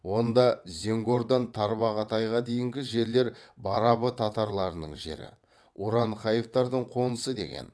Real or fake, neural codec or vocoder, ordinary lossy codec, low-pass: fake; vocoder, 22.05 kHz, 80 mel bands, WaveNeXt; none; none